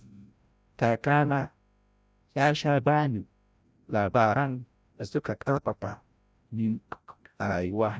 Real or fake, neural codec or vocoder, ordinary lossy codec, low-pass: fake; codec, 16 kHz, 0.5 kbps, FreqCodec, larger model; none; none